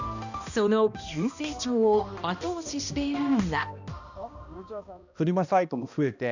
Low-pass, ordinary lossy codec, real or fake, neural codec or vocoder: 7.2 kHz; none; fake; codec, 16 kHz, 1 kbps, X-Codec, HuBERT features, trained on balanced general audio